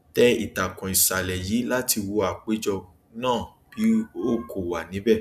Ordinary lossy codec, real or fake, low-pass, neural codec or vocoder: none; real; 14.4 kHz; none